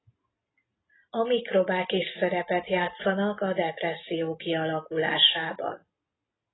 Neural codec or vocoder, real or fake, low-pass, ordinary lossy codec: none; real; 7.2 kHz; AAC, 16 kbps